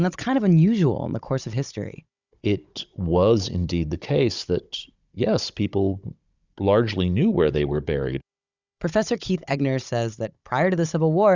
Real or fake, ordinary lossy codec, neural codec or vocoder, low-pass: fake; Opus, 64 kbps; codec, 16 kHz, 16 kbps, FunCodec, trained on Chinese and English, 50 frames a second; 7.2 kHz